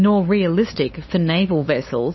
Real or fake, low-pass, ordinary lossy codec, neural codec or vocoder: fake; 7.2 kHz; MP3, 24 kbps; codec, 16 kHz, 8 kbps, FunCodec, trained on LibriTTS, 25 frames a second